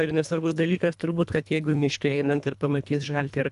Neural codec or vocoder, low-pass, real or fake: codec, 24 kHz, 1.5 kbps, HILCodec; 10.8 kHz; fake